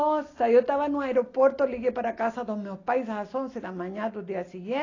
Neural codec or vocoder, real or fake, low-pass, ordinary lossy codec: none; real; 7.2 kHz; AAC, 32 kbps